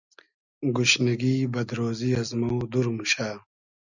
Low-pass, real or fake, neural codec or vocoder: 7.2 kHz; real; none